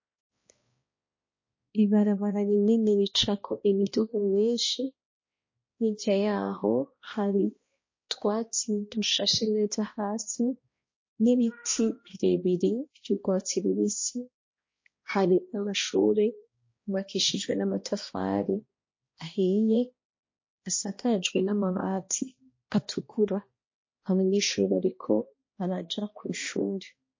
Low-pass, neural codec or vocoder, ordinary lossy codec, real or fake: 7.2 kHz; codec, 16 kHz, 1 kbps, X-Codec, HuBERT features, trained on balanced general audio; MP3, 32 kbps; fake